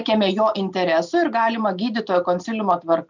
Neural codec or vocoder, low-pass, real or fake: none; 7.2 kHz; real